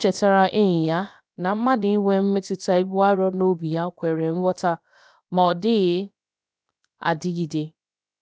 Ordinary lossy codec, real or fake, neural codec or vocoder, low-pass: none; fake; codec, 16 kHz, about 1 kbps, DyCAST, with the encoder's durations; none